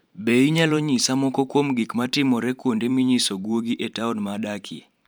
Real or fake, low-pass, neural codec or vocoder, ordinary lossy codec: fake; none; vocoder, 44.1 kHz, 128 mel bands every 512 samples, BigVGAN v2; none